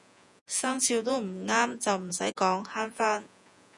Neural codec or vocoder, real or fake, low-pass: vocoder, 48 kHz, 128 mel bands, Vocos; fake; 10.8 kHz